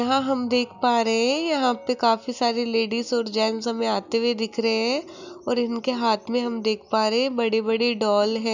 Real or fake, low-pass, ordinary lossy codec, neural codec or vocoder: real; 7.2 kHz; none; none